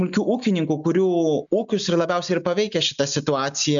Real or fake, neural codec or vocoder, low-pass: real; none; 7.2 kHz